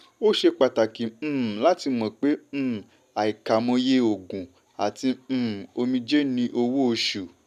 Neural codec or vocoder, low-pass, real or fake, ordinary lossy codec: none; 14.4 kHz; real; none